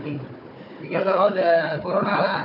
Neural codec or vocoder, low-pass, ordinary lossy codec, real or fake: codec, 16 kHz, 4 kbps, FunCodec, trained on Chinese and English, 50 frames a second; 5.4 kHz; none; fake